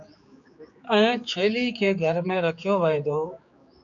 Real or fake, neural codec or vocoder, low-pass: fake; codec, 16 kHz, 4 kbps, X-Codec, HuBERT features, trained on general audio; 7.2 kHz